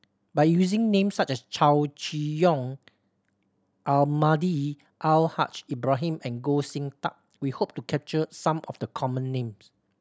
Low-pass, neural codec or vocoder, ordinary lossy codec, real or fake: none; none; none; real